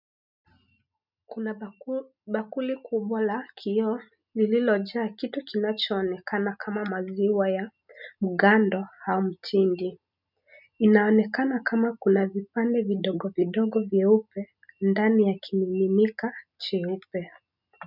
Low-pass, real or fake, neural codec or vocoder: 5.4 kHz; real; none